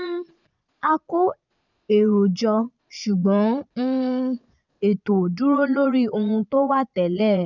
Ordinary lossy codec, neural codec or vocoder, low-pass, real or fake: none; vocoder, 22.05 kHz, 80 mel bands, Vocos; 7.2 kHz; fake